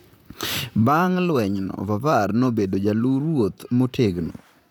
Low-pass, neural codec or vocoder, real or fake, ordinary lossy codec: none; vocoder, 44.1 kHz, 128 mel bands, Pupu-Vocoder; fake; none